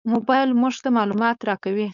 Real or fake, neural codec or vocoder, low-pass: fake; codec, 16 kHz, 4.8 kbps, FACodec; 7.2 kHz